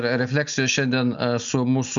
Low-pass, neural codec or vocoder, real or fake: 7.2 kHz; none; real